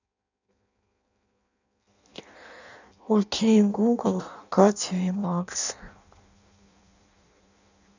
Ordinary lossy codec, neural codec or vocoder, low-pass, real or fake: none; codec, 16 kHz in and 24 kHz out, 0.6 kbps, FireRedTTS-2 codec; 7.2 kHz; fake